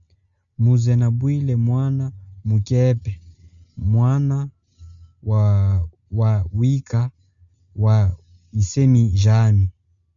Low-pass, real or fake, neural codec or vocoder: 7.2 kHz; real; none